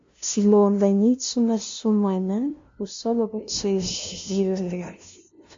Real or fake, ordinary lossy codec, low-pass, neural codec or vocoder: fake; MP3, 48 kbps; 7.2 kHz; codec, 16 kHz, 0.5 kbps, FunCodec, trained on LibriTTS, 25 frames a second